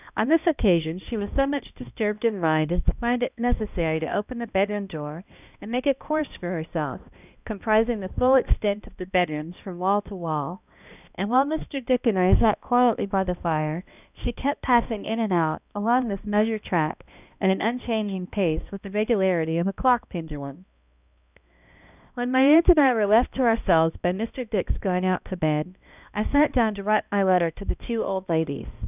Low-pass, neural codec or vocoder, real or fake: 3.6 kHz; codec, 16 kHz, 1 kbps, X-Codec, HuBERT features, trained on balanced general audio; fake